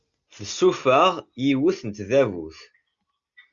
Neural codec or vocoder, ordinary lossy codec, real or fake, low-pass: none; Opus, 64 kbps; real; 7.2 kHz